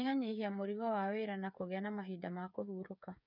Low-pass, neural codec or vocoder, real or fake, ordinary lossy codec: 5.4 kHz; codec, 16 kHz, 8 kbps, FreqCodec, smaller model; fake; none